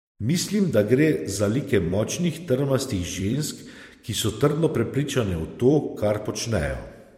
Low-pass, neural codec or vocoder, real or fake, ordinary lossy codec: 19.8 kHz; vocoder, 44.1 kHz, 128 mel bands every 256 samples, BigVGAN v2; fake; MP3, 64 kbps